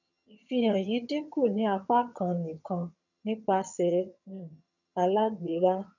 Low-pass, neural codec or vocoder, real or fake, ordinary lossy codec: 7.2 kHz; vocoder, 22.05 kHz, 80 mel bands, HiFi-GAN; fake; none